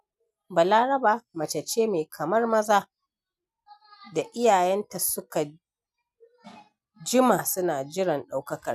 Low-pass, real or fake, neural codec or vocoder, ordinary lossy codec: 14.4 kHz; real; none; none